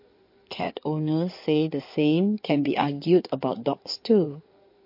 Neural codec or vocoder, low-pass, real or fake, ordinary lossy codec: codec, 16 kHz in and 24 kHz out, 2.2 kbps, FireRedTTS-2 codec; 5.4 kHz; fake; MP3, 32 kbps